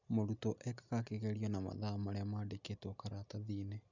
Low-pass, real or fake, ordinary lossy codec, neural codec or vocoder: 7.2 kHz; real; none; none